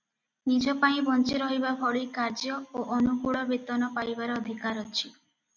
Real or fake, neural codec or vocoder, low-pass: real; none; 7.2 kHz